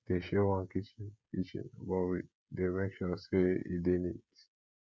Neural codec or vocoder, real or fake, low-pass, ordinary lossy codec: codec, 16 kHz, 16 kbps, FreqCodec, smaller model; fake; none; none